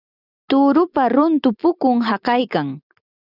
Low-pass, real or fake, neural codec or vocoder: 5.4 kHz; real; none